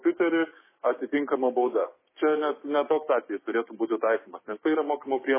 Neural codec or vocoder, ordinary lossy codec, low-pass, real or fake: codec, 16 kHz, 6 kbps, DAC; MP3, 16 kbps; 3.6 kHz; fake